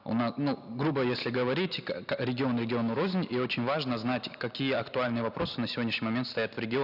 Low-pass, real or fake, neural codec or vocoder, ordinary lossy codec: 5.4 kHz; real; none; none